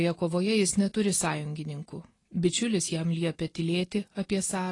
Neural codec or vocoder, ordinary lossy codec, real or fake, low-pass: none; AAC, 32 kbps; real; 10.8 kHz